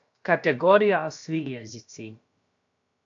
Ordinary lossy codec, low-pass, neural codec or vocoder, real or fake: AAC, 64 kbps; 7.2 kHz; codec, 16 kHz, about 1 kbps, DyCAST, with the encoder's durations; fake